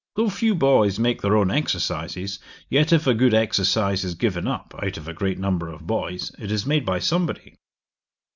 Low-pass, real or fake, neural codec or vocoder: 7.2 kHz; real; none